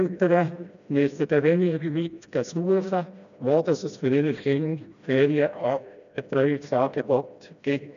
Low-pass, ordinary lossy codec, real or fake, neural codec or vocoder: 7.2 kHz; none; fake; codec, 16 kHz, 1 kbps, FreqCodec, smaller model